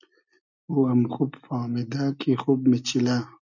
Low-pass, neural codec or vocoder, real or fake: 7.2 kHz; none; real